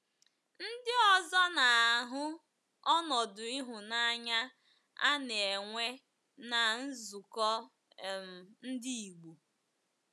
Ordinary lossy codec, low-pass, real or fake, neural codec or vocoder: none; none; real; none